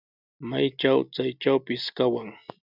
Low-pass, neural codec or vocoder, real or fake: 5.4 kHz; none; real